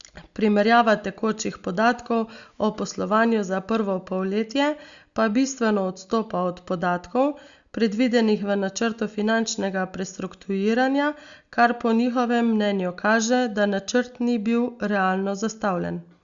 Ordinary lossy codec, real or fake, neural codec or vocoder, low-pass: Opus, 64 kbps; real; none; 7.2 kHz